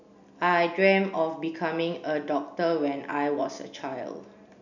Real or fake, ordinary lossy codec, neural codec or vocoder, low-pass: real; none; none; 7.2 kHz